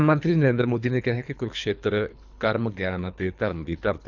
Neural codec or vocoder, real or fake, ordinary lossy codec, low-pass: codec, 24 kHz, 3 kbps, HILCodec; fake; none; 7.2 kHz